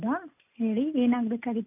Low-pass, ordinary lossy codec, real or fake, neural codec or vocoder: 3.6 kHz; none; real; none